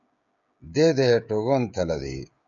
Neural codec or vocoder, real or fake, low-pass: codec, 16 kHz, 16 kbps, FreqCodec, smaller model; fake; 7.2 kHz